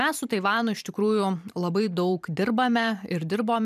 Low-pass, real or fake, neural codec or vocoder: 14.4 kHz; fake; vocoder, 44.1 kHz, 128 mel bands every 512 samples, BigVGAN v2